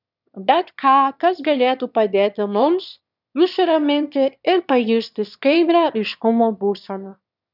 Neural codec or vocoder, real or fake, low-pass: autoencoder, 22.05 kHz, a latent of 192 numbers a frame, VITS, trained on one speaker; fake; 5.4 kHz